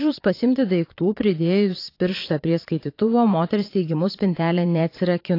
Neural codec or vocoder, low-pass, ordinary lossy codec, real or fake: vocoder, 22.05 kHz, 80 mel bands, Vocos; 5.4 kHz; AAC, 32 kbps; fake